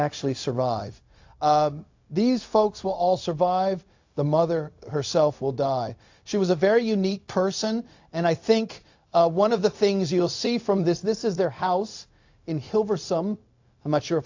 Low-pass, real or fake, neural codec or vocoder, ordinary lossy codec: 7.2 kHz; fake; codec, 16 kHz, 0.4 kbps, LongCat-Audio-Codec; AAC, 48 kbps